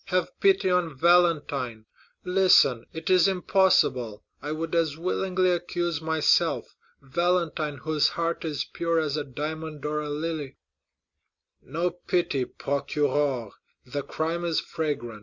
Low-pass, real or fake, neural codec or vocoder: 7.2 kHz; real; none